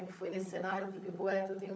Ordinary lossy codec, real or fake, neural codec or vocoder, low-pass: none; fake; codec, 16 kHz, 16 kbps, FunCodec, trained on LibriTTS, 50 frames a second; none